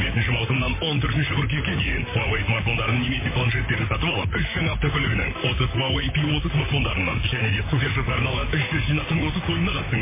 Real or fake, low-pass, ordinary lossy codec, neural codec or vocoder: fake; 3.6 kHz; MP3, 16 kbps; vocoder, 44.1 kHz, 128 mel bands, Pupu-Vocoder